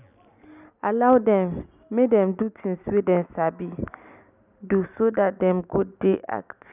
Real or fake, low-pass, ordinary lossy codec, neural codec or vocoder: real; 3.6 kHz; none; none